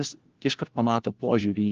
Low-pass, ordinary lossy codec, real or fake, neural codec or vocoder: 7.2 kHz; Opus, 16 kbps; fake; codec, 16 kHz, 1 kbps, FunCodec, trained on LibriTTS, 50 frames a second